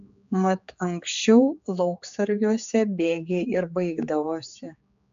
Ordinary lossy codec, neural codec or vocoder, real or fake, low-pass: MP3, 64 kbps; codec, 16 kHz, 4 kbps, X-Codec, HuBERT features, trained on general audio; fake; 7.2 kHz